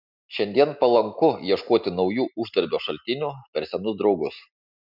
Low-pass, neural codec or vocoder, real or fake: 5.4 kHz; none; real